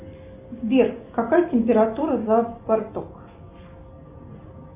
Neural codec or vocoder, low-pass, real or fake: none; 3.6 kHz; real